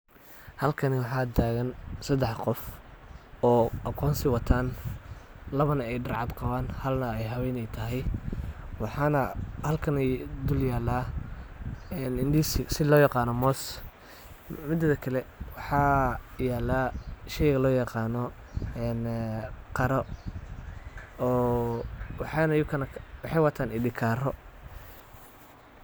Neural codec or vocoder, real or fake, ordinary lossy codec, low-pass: none; real; none; none